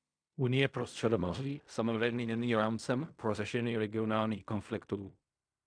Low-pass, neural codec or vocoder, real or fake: 9.9 kHz; codec, 16 kHz in and 24 kHz out, 0.4 kbps, LongCat-Audio-Codec, fine tuned four codebook decoder; fake